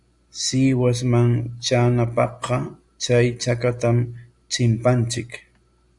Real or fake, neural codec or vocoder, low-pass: real; none; 10.8 kHz